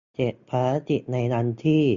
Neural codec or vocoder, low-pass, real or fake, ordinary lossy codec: none; 7.2 kHz; real; MP3, 64 kbps